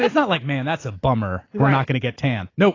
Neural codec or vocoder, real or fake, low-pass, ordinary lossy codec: none; real; 7.2 kHz; AAC, 32 kbps